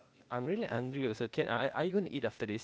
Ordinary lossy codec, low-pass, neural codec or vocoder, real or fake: none; none; codec, 16 kHz, 0.8 kbps, ZipCodec; fake